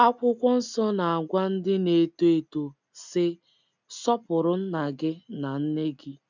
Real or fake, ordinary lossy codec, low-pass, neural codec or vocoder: real; none; 7.2 kHz; none